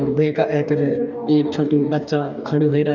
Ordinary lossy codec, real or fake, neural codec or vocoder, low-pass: none; fake; codec, 44.1 kHz, 2.6 kbps, DAC; 7.2 kHz